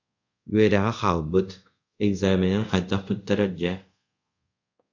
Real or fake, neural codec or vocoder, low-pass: fake; codec, 24 kHz, 0.5 kbps, DualCodec; 7.2 kHz